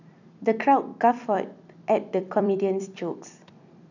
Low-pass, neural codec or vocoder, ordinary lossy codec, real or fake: 7.2 kHz; vocoder, 44.1 kHz, 128 mel bands every 256 samples, BigVGAN v2; none; fake